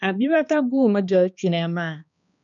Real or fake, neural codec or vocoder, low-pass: fake; codec, 16 kHz, 2 kbps, X-Codec, HuBERT features, trained on balanced general audio; 7.2 kHz